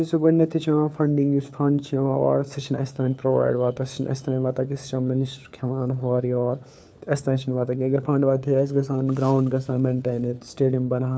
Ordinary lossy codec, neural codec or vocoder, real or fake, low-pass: none; codec, 16 kHz, 4 kbps, FunCodec, trained on LibriTTS, 50 frames a second; fake; none